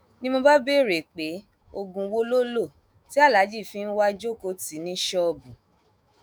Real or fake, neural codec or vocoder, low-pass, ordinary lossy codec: fake; autoencoder, 48 kHz, 128 numbers a frame, DAC-VAE, trained on Japanese speech; none; none